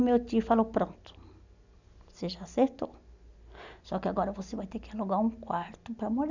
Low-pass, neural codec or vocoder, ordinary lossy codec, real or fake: 7.2 kHz; none; none; real